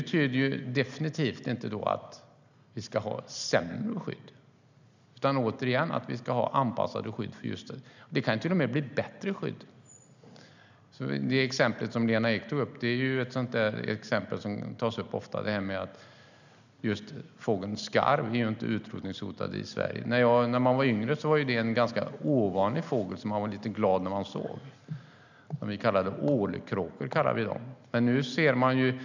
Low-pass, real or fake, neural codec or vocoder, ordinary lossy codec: 7.2 kHz; real; none; none